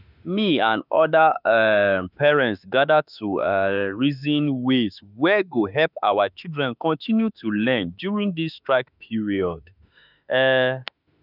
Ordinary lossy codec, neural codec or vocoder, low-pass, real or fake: none; autoencoder, 48 kHz, 32 numbers a frame, DAC-VAE, trained on Japanese speech; 5.4 kHz; fake